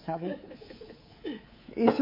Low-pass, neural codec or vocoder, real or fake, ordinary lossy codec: 5.4 kHz; codec, 24 kHz, 3.1 kbps, DualCodec; fake; MP3, 24 kbps